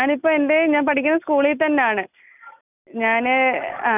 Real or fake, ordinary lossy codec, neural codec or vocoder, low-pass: real; none; none; 3.6 kHz